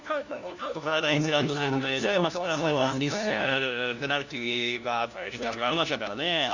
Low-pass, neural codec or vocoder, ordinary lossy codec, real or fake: 7.2 kHz; codec, 16 kHz, 1 kbps, FunCodec, trained on LibriTTS, 50 frames a second; none; fake